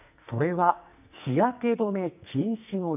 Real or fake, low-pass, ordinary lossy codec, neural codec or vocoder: fake; 3.6 kHz; none; codec, 44.1 kHz, 2.6 kbps, SNAC